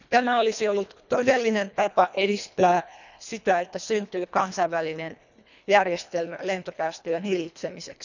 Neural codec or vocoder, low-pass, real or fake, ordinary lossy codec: codec, 24 kHz, 1.5 kbps, HILCodec; 7.2 kHz; fake; none